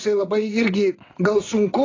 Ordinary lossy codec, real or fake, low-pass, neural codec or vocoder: AAC, 32 kbps; fake; 7.2 kHz; vocoder, 22.05 kHz, 80 mel bands, WaveNeXt